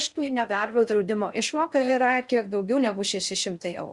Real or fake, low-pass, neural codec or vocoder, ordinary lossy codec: fake; 10.8 kHz; codec, 16 kHz in and 24 kHz out, 0.6 kbps, FocalCodec, streaming, 4096 codes; Opus, 64 kbps